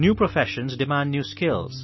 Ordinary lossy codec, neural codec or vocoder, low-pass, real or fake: MP3, 24 kbps; none; 7.2 kHz; real